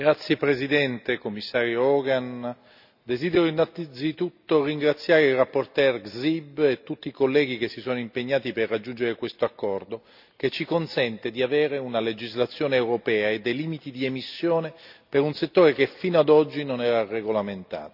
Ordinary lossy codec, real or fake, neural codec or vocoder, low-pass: none; real; none; 5.4 kHz